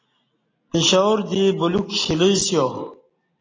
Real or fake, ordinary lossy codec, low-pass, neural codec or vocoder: real; AAC, 32 kbps; 7.2 kHz; none